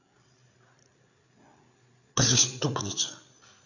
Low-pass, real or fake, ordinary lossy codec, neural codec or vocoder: 7.2 kHz; fake; none; codec, 16 kHz, 8 kbps, FreqCodec, larger model